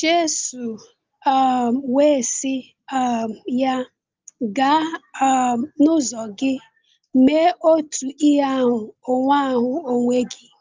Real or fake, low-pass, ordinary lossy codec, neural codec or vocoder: real; 7.2 kHz; Opus, 24 kbps; none